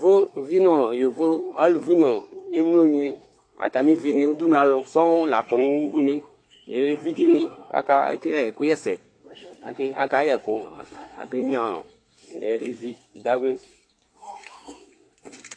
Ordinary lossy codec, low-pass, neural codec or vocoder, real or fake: AAC, 48 kbps; 9.9 kHz; codec, 24 kHz, 1 kbps, SNAC; fake